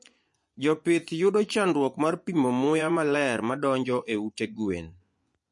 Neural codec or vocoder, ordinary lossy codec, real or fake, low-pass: vocoder, 24 kHz, 100 mel bands, Vocos; MP3, 48 kbps; fake; 10.8 kHz